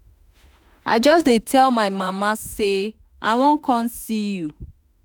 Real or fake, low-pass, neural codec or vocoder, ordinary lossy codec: fake; none; autoencoder, 48 kHz, 32 numbers a frame, DAC-VAE, trained on Japanese speech; none